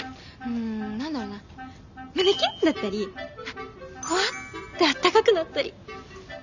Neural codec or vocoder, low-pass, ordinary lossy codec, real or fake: none; 7.2 kHz; none; real